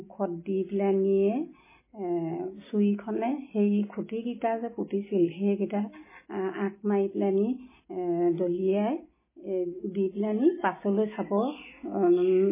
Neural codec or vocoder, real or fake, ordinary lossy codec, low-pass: none; real; MP3, 16 kbps; 3.6 kHz